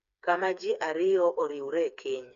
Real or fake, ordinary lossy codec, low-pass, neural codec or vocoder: fake; none; 7.2 kHz; codec, 16 kHz, 4 kbps, FreqCodec, smaller model